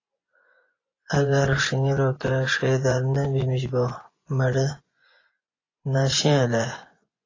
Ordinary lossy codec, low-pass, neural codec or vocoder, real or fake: AAC, 32 kbps; 7.2 kHz; vocoder, 24 kHz, 100 mel bands, Vocos; fake